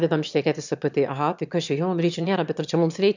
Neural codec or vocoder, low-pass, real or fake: autoencoder, 22.05 kHz, a latent of 192 numbers a frame, VITS, trained on one speaker; 7.2 kHz; fake